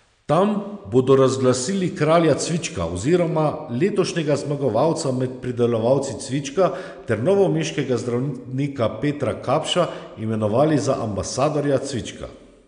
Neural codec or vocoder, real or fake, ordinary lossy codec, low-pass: none; real; none; 9.9 kHz